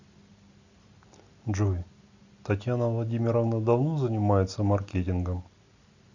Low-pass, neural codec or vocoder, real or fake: 7.2 kHz; none; real